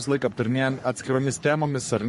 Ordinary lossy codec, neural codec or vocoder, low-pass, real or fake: MP3, 48 kbps; codec, 44.1 kHz, 3.4 kbps, Pupu-Codec; 14.4 kHz; fake